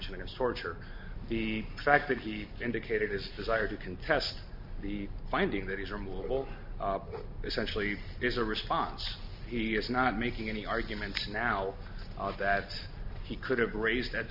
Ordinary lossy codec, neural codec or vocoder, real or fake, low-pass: MP3, 48 kbps; none; real; 5.4 kHz